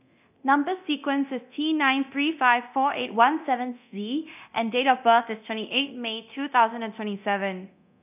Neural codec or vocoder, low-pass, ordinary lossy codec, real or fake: codec, 24 kHz, 0.9 kbps, DualCodec; 3.6 kHz; none; fake